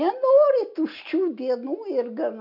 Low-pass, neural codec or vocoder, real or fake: 5.4 kHz; none; real